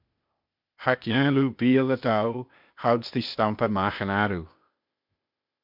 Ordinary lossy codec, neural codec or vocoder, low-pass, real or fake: MP3, 48 kbps; codec, 16 kHz, 0.8 kbps, ZipCodec; 5.4 kHz; fake